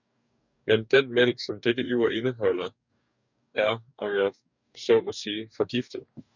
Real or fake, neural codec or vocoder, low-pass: fake; codec, 44.1 kHz, 2.6 kbps, DAC; 7.2 kHz